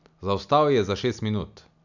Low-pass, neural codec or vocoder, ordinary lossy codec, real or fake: 7.2 kHz; none; none; real